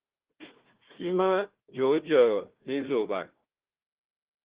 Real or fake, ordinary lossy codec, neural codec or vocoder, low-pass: fake; Opus, 16 kbps; codec, 16 kHz, 1 kbps, FunCodec, trained on Chinese and English, 50 frames a second; 3.6 kHz